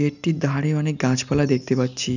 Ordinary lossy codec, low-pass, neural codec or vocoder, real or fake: none; 7.2 kHz; none; real